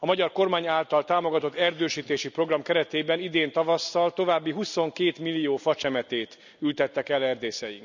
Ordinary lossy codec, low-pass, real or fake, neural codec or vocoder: none; 7.2 kHz; real; none